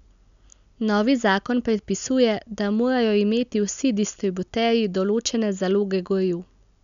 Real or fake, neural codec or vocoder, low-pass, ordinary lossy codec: real; none; 7.2 kHz; none